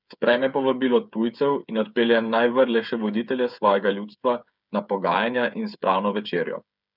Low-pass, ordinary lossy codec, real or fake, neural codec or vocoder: 5.4 kHz; none; fake; codec, 16 kHz, 8 kbps, FreqCodec, smaller model